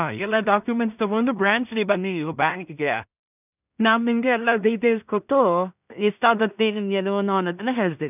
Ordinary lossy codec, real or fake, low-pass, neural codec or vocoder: none; fake; 3.6 kHz; codec, 16 kHz in and 24 kHz out, 0.4 kbps, LongCat-Audio-Codec, two codebook decoder